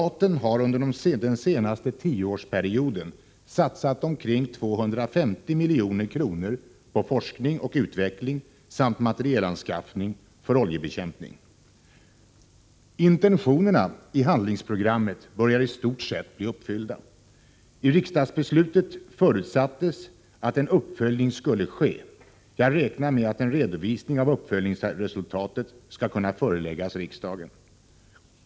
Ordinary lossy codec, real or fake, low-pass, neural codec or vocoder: none; real; none; none